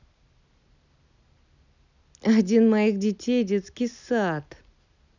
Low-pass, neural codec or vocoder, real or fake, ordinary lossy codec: 7.2 kHz; none; real; none